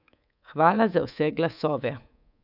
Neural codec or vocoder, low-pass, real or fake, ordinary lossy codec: codec, 24 kHz, 3.1 kbps, DualCodec; 5.4 kHz; fake; none